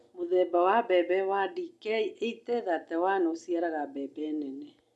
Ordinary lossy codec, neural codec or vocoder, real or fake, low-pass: none; none; real; none